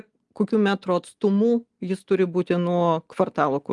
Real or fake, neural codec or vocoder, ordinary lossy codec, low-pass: real; none; Opus, 32 kbps; 10.8 kHz